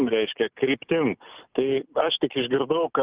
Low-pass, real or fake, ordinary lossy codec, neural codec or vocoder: 3.6 kHz; real; Opus, 32 kbps; none